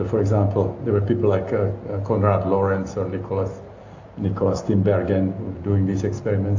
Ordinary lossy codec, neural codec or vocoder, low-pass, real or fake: AAC, 48 kbps; none; 7.2 kHz; real